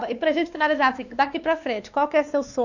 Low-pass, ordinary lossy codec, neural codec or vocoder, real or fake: 7.2 kHz; none; codec, 16 kHz, 2 kbps, X-Codec, WavLM features, trained on Multilingual LibriSpeech; fake